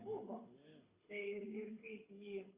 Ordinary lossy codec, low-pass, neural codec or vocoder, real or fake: Opus, 64 kbps; 3.6 kHz; codec, 24 kHz, 6 kbps, HILCodec; fake